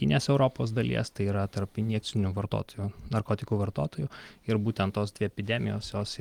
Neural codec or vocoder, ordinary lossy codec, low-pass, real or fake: none; Opus, 32 kbps; 19.8 kHz; real